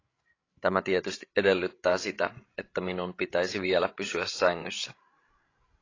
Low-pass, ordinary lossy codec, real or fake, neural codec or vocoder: 7.2 kHz; AAC, 32 kbps; fake; codec, 16 kHz, 16 kbps, FreqCodec, larger model